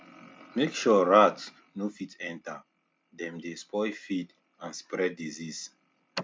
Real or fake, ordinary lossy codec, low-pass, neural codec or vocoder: real; none; none; none